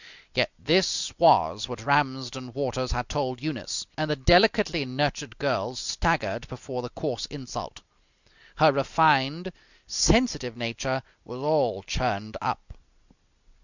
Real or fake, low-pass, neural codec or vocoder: real; 7.2 kHz; none